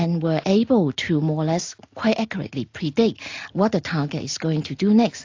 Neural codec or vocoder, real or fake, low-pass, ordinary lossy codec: none; real; 7.2 kHz; AAC, 48 kbps